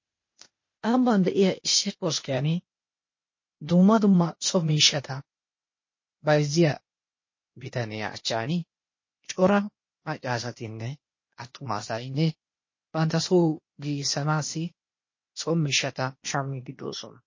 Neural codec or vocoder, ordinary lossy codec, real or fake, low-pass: codec, 16 kHz, 0.8 kbps, ZipCodec; MP3, 32 kbps; fake; 7.2 kHz